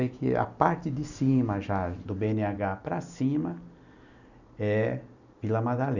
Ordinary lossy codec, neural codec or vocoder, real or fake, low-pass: none; none; real; 7.2 kHz